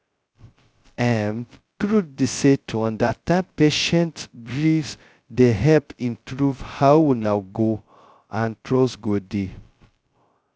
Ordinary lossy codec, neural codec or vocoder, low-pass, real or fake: none; codec, 16 kHz, 0.2 kbps, FocalCodec; none; fake